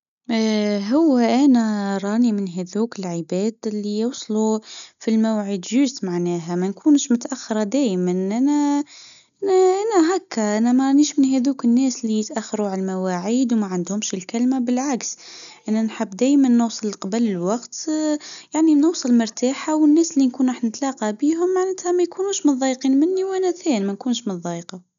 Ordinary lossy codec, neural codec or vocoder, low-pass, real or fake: none; none; 7.2 kHz; real